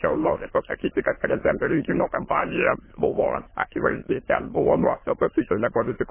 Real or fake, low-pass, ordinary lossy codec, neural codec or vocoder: fake; 3.6 kHz; MP3, 16 kbps; autoencoder, 22.05 kHz, a latent of 192 numbers a frame, VITS, trained on many speakers